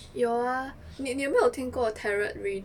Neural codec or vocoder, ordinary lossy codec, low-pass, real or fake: none; none; 19.8 kHz; real